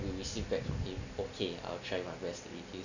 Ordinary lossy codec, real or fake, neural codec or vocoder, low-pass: none; real; none; 7.2 kHz